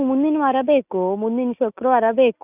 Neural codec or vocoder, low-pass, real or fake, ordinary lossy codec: none; 3.6 kHz; real; none